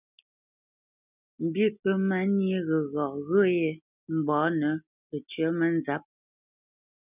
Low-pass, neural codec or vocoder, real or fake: 3.6 kHz; none; real